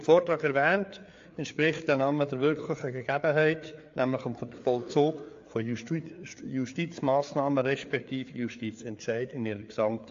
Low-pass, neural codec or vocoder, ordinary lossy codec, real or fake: 7.2 kHz; codec, 16 kHz, 4 kbps, FreqCodec, larger model; AAC, 48 kbps; fake